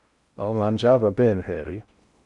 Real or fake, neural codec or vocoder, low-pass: fake; codec, 16 kHz in and 24 kHz out, 0.6 kbps, FocalCodec, streaming, 4096 codes; 10.8 kHz